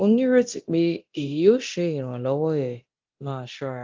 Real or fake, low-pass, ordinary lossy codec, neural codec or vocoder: fake; 7.2 kHz; Opus, 32 kbps; codec, 24 kHz, 0.9 kbps, DualCodec